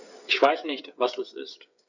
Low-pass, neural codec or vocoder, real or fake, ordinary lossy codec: 7.2 kHz; codec, 16 kHz, 8 kbps, FreqCodec, smaller model; fake; none